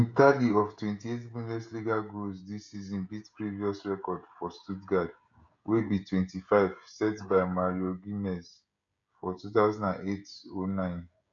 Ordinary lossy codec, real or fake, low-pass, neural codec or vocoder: none; fake; 7.2 kHz; codec, 16 kHz, 16 kbps, FreqCodec, smaller model